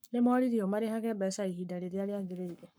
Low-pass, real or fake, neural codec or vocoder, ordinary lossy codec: none; fake; codec, 44.1 kHz, 7.8 kbps, Pupu-Codec; none